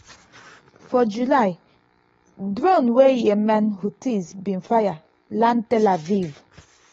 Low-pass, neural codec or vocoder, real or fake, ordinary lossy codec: 19.8 kHz; codec, 44.1 kHz, 7.8 kbps, DAC; fake; AAC, 24 kbps